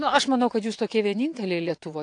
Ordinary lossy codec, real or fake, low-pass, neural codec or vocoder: AAC, 48 kbps; fake; 9.9 kHz; vocoder, 22.05 kHz, 80 mel bands, WaveNeXt